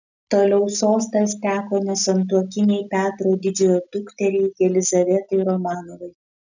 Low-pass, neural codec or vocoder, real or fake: 7.2 kHz; none; real